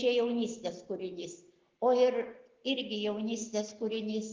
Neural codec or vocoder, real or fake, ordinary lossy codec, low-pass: none; real; Opus, 16 kbps; 7.2 kHz